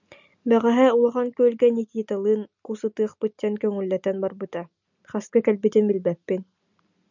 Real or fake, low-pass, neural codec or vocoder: real; 7.2 kHz; none